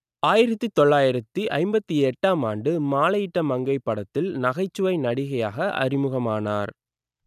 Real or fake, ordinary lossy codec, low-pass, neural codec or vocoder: real; none; 14.4 kHz; none